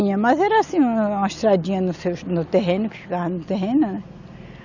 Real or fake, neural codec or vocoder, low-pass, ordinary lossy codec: real; none; 7.2 kHz; none